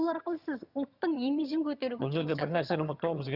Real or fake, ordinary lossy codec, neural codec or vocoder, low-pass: fake; Opus, 64 kbps; vocoder, 22.05 kHz, 80 mel bands, HiFi-GAN; 5.4 kHz